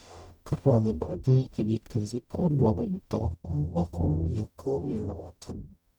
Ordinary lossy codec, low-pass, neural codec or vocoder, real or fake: none; 19.8 kHz; codec, 44.1 kHz, 0.9 kbps, DAC; fake